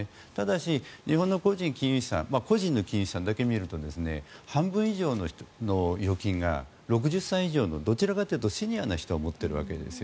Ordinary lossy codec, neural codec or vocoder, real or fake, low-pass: none; none; real; none